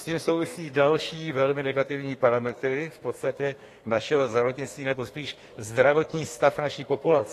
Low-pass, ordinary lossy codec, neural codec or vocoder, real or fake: 14.4 kHz; AAC, 48 kbps; codec, 32 kHz, 1.9 kbps, SNAC; fake